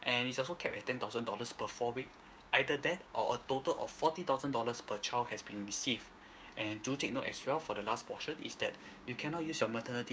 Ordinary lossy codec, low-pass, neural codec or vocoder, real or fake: none; none; codec, 16 kHz, 6 kbps, DAC; fake